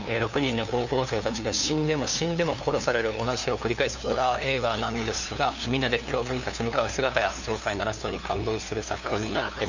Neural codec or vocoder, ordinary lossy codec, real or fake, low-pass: codec, 16 kHz, 2 kbps, FunCodec, trained on LibriTTS, 25 frames a second; none; fake; 7.2 kHz